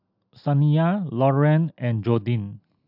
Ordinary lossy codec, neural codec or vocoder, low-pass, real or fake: AAC, 48 kbps; none; 5.4 kHz; real